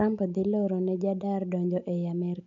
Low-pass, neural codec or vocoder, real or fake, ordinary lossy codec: 7.2 kHz; none; real; MP3, 64 kbps